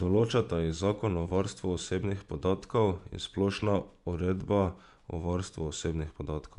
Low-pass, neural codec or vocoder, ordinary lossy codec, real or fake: 10.8 kHz; vocoder, 24 kHz, 100 mel bands, Vocos; none; fake